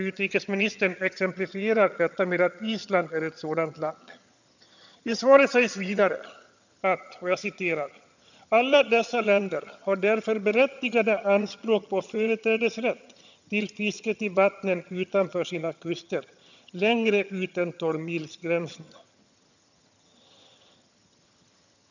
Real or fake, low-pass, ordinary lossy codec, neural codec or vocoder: fake; 7.2 kHz; none; vocoder, 22.05 kHz, 80 mel bands, HiFi-GAN